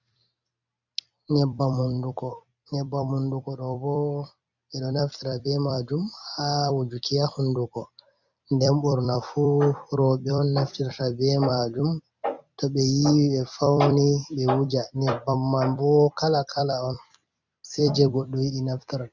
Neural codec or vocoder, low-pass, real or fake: vocoder, 44.1 kHz, 128 mel bands every 256 samples, BigVGAN v2; 7.2 kHz; fake